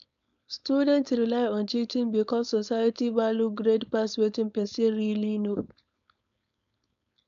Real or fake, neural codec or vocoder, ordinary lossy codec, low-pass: fake; codec, 16 kHz, 4.8 kbps, FACodec; none; 7.2 kHz